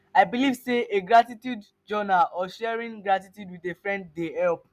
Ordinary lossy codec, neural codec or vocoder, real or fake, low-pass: none; none; real; 14.4 kHz